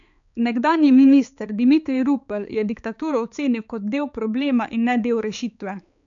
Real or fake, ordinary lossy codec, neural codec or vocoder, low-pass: fake; none; codec, 16 kHz, 4 kbps, X-Codec, HuBERT features, trained on balanced general audio; 7.2 kHz